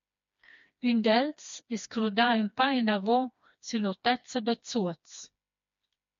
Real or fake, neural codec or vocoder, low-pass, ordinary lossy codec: fake; codec, 16 kHz, 2 kbps, FreqCodec, smaller model; 7.2 kHz; MP3, 48 kbps